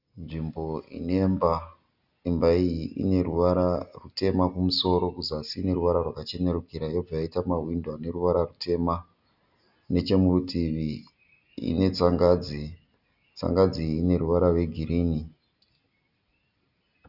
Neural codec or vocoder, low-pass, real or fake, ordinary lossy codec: none; 5.4 kHz; real; Opus, 64 kbps